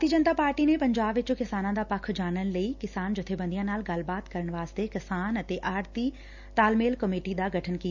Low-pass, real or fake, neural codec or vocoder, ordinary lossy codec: 7.2 kHz; real; none; none